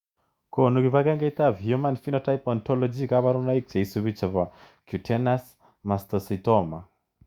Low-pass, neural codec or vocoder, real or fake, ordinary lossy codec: 19.8 kHz; autoencoder, 48 kHz, 128 numbers a frame, DAC-VAE, trained on Japanese speech; fake; Opus, 64 kbps